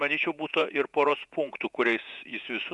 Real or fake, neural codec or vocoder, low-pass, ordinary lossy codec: fake; vocoder, 48 kHz, 128 mel bands, Vocos; 10.8 kHz; Opus, 64 kbps